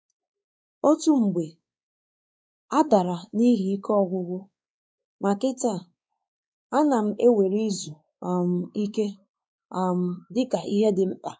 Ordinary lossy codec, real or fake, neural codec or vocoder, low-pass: none; fake; codec, 16 kHz, 4 kbps, X-Codec, WavLM features, trained on Multilingual LibriSpeech; none